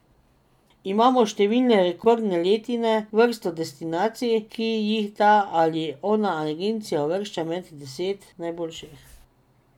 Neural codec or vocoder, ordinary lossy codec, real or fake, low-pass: none; none; real; 19.8 kHz